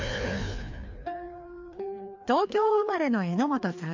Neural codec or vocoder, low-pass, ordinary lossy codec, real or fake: codec, 16 kHz, 2 kbps, FreqCodec, larger model; 7.2 kHz; MP3, 64 kbps; fake